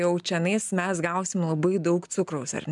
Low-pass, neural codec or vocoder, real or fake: 10.8 kHz; none; real